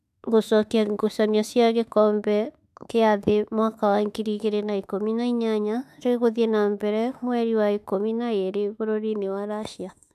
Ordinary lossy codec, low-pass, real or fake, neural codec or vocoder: none; 14.4 kHz; fake; autoencoder, 48 kHz, 32 numbers a frame, DAC-VAE, trained on Japanese speech